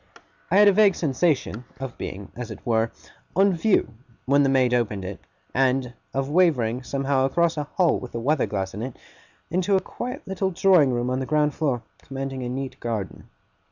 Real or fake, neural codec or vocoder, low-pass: real; none; 7.2 kHz